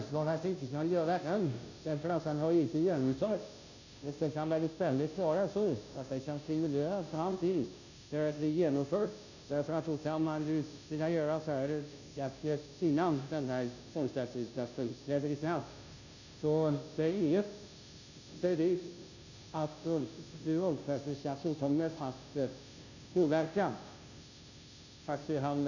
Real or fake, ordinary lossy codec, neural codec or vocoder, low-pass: fake; none; codec, 16 kHz, 0.5 kbps, FunCodec, trained on Chinese and English, 25 frames a second; 7.2 kHz